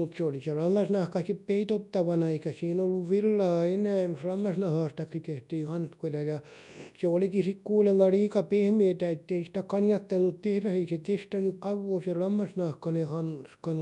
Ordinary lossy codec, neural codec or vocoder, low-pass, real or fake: MP3, 96 kbps; codec, 24 kHz, 0.9 kbps, WavTokenizer, large speech release; 10.8 kHz; fake